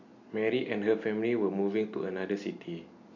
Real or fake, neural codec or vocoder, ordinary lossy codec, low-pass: real; none; none; 7.2 kHz